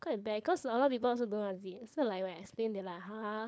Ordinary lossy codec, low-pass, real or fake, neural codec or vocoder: none; none; fake; codec, 16 kHz, 4.8 kbps, FACodec